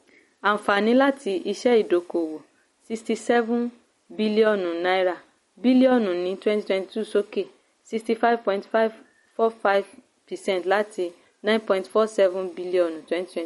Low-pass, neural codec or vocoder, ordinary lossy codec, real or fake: 19.8 kHz; none; MP3, 48 kbps; real